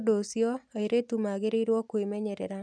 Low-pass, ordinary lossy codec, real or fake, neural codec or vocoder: none; none; real; none